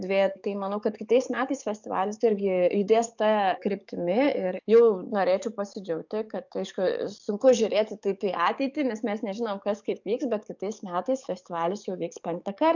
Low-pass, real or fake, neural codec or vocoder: 7.2 kHz; fake; codec, 44.1 kHz, 7.8 kbps, DAC